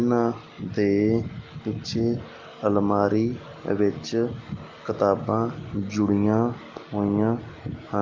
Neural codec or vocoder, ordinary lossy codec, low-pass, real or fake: none; Opus, 32 kbps; 7.2 kHz; real